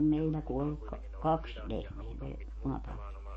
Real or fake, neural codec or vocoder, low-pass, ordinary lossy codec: fake; codec, 44.1 kHz, 7.8 kbps, DAC; 9.9 kHz; MP3, 32 kbps